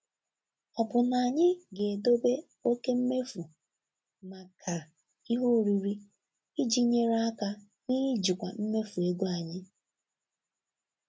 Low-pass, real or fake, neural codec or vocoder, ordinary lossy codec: none; real; none; none